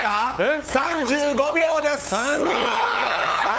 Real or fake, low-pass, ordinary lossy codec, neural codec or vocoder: fake; none; none; codec, 16 kHz, 8 kbps, FunCodec, trained on LibriTTS, 25 frames a second